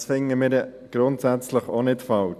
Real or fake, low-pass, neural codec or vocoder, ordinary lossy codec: real; 14.4 kHz; none; none